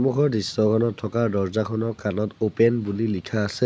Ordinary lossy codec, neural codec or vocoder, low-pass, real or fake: none; none; none; real